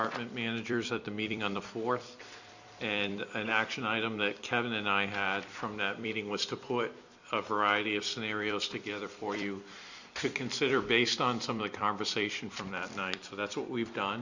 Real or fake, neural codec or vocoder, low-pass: fake; vocoder, 44.1 kHz, 128 mel bands every 256 samples, BigVGAN v2; 7.2 kHz